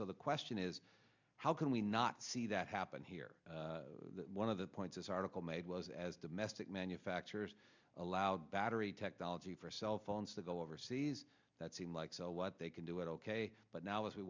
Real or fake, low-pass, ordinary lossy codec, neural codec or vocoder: real; 7.2 kHz; MP3, 64 kbps; none